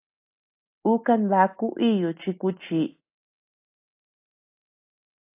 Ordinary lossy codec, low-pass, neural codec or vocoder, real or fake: AAC, 24 kbps; 3.6 kHz; none; real